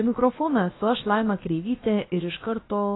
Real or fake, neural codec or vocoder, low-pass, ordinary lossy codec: fake; codec, 16 kHz, about 1 kbps, DyCAST, with the encoder's durations; 7.2 kHz; AAC, 16 kbps